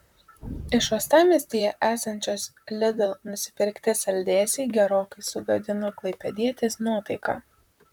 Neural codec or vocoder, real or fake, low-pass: vocoder, 44.1 kHz, 128 mel bands, Pupu-Vocoder; fake; 19.8 kHz